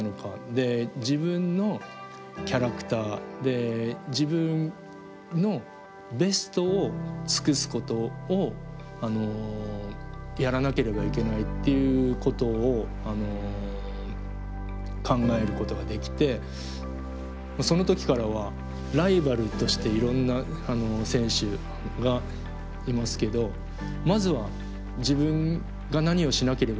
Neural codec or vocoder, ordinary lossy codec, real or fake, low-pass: none; none; real; none